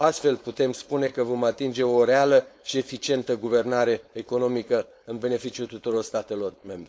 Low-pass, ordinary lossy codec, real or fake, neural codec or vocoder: none; none; fake; codec, 16 kHz, 4.8 kbps, FACodec